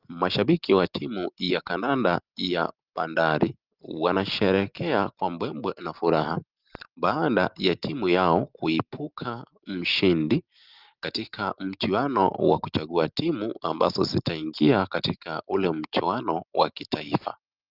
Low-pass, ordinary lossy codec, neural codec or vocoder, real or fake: 5.4 kHz; Opus, 32 kbps; none; real